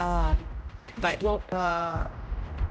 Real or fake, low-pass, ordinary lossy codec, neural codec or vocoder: fake; none; none; codec, 16 kHz, 0.5 kbps, X-Codec, HuBERT features, trained on general audio